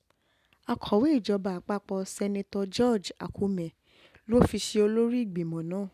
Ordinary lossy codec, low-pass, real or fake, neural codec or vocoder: none; 14.4 kHz; real; none